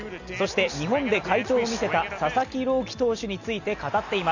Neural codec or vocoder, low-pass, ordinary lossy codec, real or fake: none; 7.2 kHz; none; real